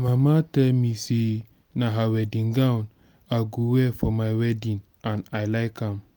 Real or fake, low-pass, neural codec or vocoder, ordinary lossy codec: real; none; none; none